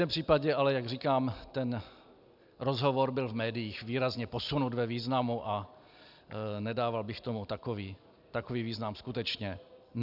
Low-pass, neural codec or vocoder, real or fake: 5.4 kHz; none; real